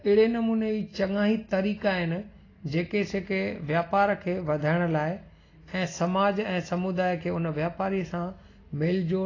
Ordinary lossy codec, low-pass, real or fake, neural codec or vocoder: AAC, 32 kbps; 7.2 kHz; real; none